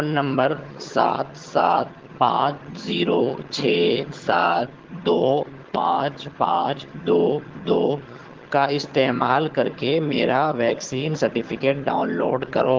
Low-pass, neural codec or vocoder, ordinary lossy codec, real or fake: 7.2 kHz; vocoder, 22.05 kHz, 80 mel bands, HiFi-GAN; Opus, 16 kbps; fake